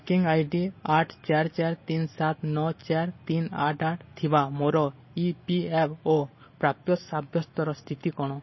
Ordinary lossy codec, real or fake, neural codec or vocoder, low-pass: MP3, 24 kbps; real; none; 7.2 kHz